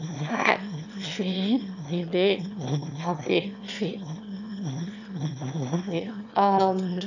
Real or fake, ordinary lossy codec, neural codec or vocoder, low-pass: fake; none; autoencoder, 22.05 kHz, a latent of 192 numbers a frame, VITS, trained on one speaker; 7.2 kHz